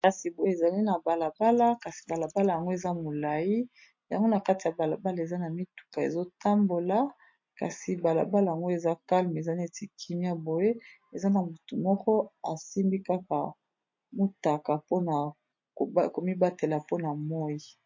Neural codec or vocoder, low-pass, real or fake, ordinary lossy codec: none; 7.2 kHz; real; MP3, 48 kbps